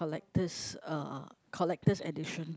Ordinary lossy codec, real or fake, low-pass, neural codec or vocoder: none; real; none; none